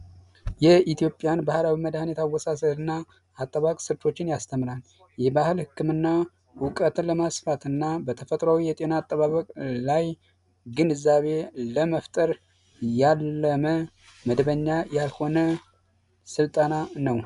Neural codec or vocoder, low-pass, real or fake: none; 10.8 kHz; real